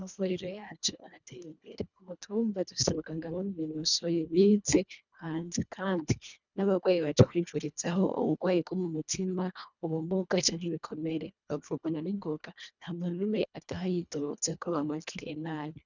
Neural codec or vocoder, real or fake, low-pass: codec, 24 kHz, 1.5 kbps, HILCodec; fake; 7.2 kHz